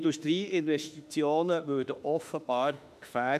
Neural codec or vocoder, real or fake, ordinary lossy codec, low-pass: autoencoder, 48 kHz, 32 numbers a frame, DAC-VAE, trained on Japanese speech; fake; none; 14.4 kHz